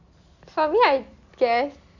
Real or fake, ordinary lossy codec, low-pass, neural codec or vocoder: real; none; 7.2 kHz; none